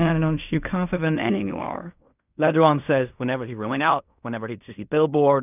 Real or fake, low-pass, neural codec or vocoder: fake; 3.6 kHz; codec, 16 kHz in and 24 kHz out, 0.4 kbps, LongCat-Audio-Codec, fine tuned four codebook decoder